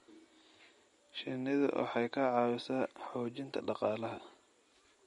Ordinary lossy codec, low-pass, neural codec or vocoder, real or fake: MP3, 48 kbps; 19.8 kHz; none; real